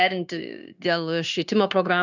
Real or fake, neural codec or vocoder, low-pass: fake; codec, 16 kHz, 0.9 kbps, LongCat-Audio-Codec; 7.2 kHz